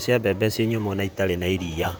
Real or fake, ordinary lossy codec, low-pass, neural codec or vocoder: fake; none; none; vocoder, 44.1 kHz, 128 mel bands, Pupu-Vocoder